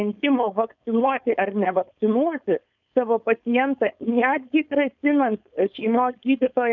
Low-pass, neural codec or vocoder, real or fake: 7.2 kHz; codec, 16 kHz, 4.8 kbps, FACodec; fake